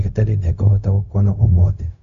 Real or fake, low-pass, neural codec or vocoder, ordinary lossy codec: fake; 7.2 kHz; codec, 16 kHz, 0.4 kbps, LongCat-Audio-Codec; none